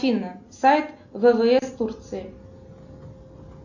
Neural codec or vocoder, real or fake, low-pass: none; real; 7.2 kHz